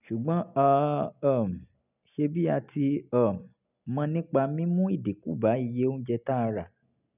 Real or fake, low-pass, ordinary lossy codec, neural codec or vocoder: real; 3.6 kHz; none; none